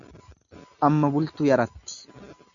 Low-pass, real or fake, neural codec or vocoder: 7.2 kHz; real; none